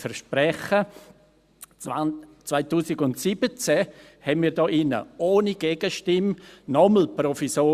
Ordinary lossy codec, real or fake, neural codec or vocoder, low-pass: Opus, 64 kbps; real; none; 14.4 kHz